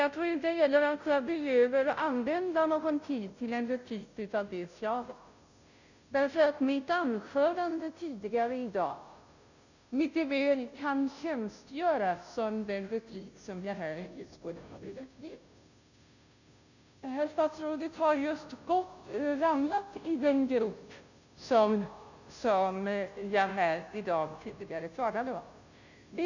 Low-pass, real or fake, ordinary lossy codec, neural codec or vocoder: 7.2 kHz; fake; none; codec, 16 kHz, 0.5 kbps, FunCodec, trained on Chinese and English, 25 frames a second